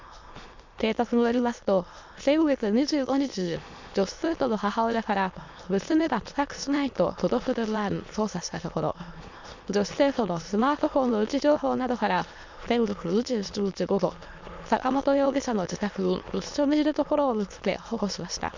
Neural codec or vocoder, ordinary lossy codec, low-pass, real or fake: autoencoder, 22.05 kHz, a latent of 192 numbers a frame, VITS, trained on many speakers; MP3, 64 kbps; 7.2 kHz; fake